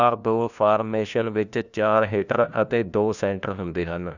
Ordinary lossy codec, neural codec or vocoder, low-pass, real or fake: none; codec, 16 kHz, 1 kbps, FunCodec, trained on LibriTTS, 50 frames a second; 7.2 kHz; fake